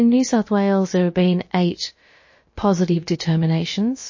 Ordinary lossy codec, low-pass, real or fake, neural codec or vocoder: MP3, 32 kbps; 7.2 kHz; fake; codec, 16 kHz, about 1 kbps, DyCAST, with the encoder's durations